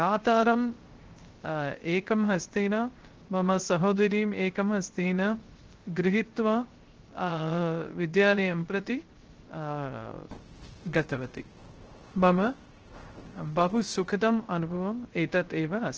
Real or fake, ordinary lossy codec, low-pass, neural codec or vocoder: fake; Opus, 16 kbps; 7.2 kHz; codec, 16 kHz, 0.3 kbps, FocalCodec